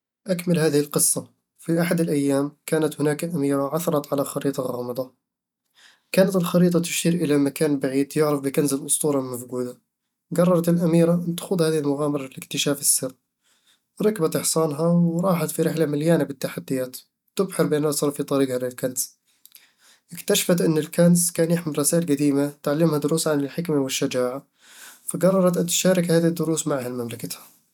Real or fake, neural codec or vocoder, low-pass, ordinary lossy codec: real; none; 19.8 kHz; none